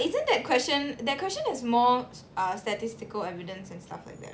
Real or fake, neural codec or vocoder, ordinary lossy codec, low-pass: real; none; none; none